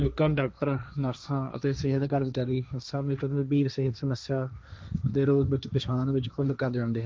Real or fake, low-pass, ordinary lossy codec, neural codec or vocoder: fake; none; none; codec, 16 kHz, 1.1 kbps, Voila-Tokenizer